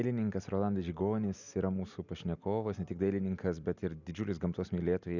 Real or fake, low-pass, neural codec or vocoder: real; 7.2 kHz; none